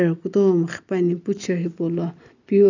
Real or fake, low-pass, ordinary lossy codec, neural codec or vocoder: real; 7.2 kHz; none; none